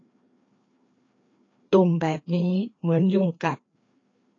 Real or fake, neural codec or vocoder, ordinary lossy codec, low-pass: fake; codec, 16 kHz, 2 kbps, FreqCodec, larger model; AAC, 32 kbps; 7.2 kHz